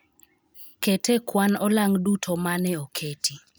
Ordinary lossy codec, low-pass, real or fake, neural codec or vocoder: none; none; real; none